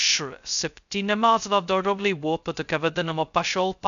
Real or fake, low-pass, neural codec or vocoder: fake; 7.2 kHz; codec, 16 kHz, 0.2 kbps, FocalCodec